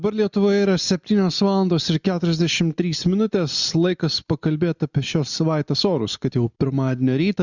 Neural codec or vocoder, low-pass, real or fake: none; 7.2 kHz; real